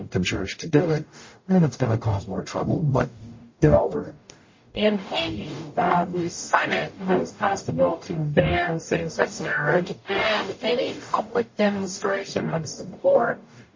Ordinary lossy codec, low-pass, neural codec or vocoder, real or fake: MP3, 32 kbps; 7.2 kHz; codec, 44.1 kHz, 0.9 kbps, DAC; fake